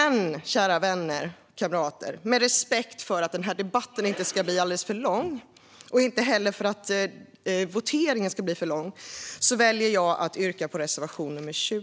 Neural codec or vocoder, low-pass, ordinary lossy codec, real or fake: none; none; none; real